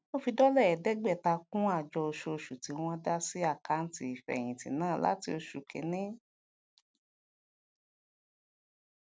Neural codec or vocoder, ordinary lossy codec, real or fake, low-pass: none; none; real; none